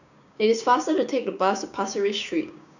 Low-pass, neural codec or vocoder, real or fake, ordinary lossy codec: 7.2 kHz; codec, 16 kHz, 6 kbps, DAC; fake; none